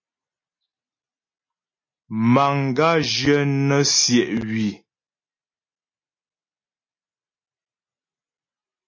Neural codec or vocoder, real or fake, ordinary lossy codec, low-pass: none; real; MP3, 32 kbps; 7.2 kHz